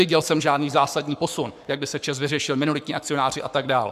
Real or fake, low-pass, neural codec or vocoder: fake; 14.4 kHz; codec, 44.1 kHz, 7.8 kbps, Pupu-Codec